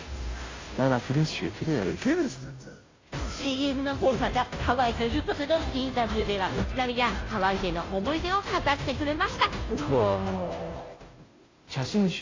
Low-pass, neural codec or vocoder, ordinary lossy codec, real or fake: 7.2 kHz; codec, 16 kHz, 0.5 kbps, FunCodec, trained on Chinese and English, 25 frames a second; AAC, 32 kbps; fake